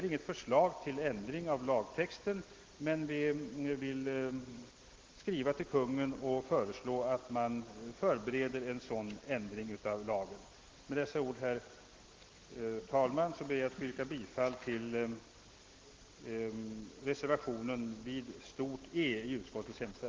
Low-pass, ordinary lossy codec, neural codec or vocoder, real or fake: 7.2 kHz; Opus, 16 kbps; none; real